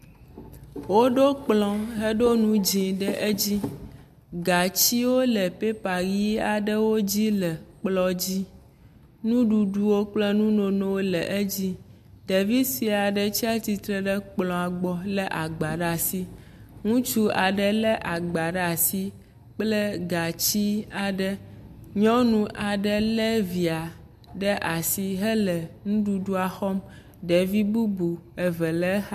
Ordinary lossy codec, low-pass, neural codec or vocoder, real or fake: MP3, 96 kbps; 14.4 kHz; none; real